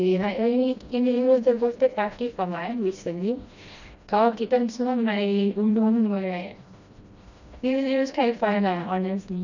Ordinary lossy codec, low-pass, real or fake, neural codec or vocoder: none; 7.2 kHz; fake; codec, 16 kHz, 1 kbps, FreqCodec, smaller model